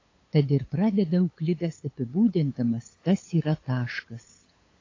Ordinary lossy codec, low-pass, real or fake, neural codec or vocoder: AAC, 32 kbps; 7.2 kHz; fake; codec, 16 kHz, 8 kbps, FunCodec, trained on LibriTTS, 25 frames a second